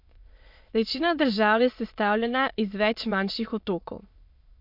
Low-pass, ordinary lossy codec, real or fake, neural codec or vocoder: 5.4 kHz; MP3, 48 kbps; fake; autoencoder, 22.05 kHz, a latent of 192 numbers a frame, VITS, trained on many speakers